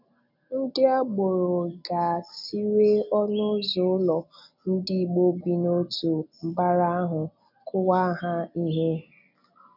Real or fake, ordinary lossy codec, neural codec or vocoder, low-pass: real; none; none; 5.4 kHz